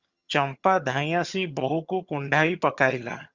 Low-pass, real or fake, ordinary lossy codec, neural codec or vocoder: 7.2 kHz; fake; Opus, 64 kbps; vocoder, 22.05 kHz, 80 mel bands, HiFi-GAN